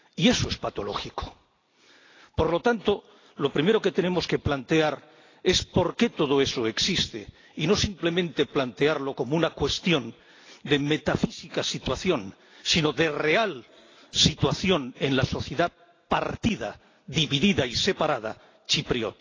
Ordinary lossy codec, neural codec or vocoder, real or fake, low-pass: AAC, 32 kbps; none; real; 7.2 kHz